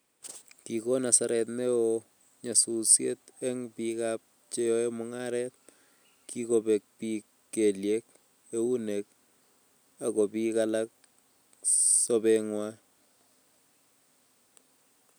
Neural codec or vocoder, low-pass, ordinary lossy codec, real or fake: none; none; none; real